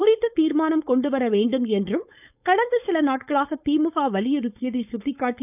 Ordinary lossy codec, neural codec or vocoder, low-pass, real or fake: none; codec, 16 kHz, 4.8 kbps, FACodec; 3.6 kHz; fake